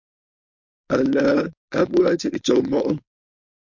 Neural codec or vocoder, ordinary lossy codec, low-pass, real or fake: codec, 16 kHz, 4.8 kbps, FACodec; MP3, 48 kbps; 7.2 kHz; fake